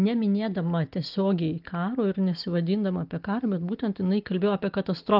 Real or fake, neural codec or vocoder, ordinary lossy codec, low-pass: real; none; Opus, 32 kbps; 5.4 kHz